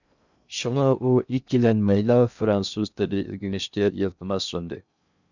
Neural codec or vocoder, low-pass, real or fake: codec, 16 kHz in and 24 kHz out, 0.6 kbps, FocalCodec, streaming, 2048 codes; 7.2 kHz; fake